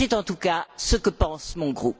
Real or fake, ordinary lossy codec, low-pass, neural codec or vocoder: real; none; none; none